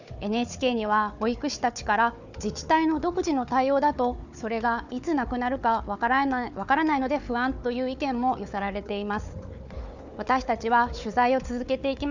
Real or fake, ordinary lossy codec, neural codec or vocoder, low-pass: fake; none; codec, 16 kHz, 4 kbps, FunCodec, trained on Chinese and English, 50 frames a second; 7.2 kHz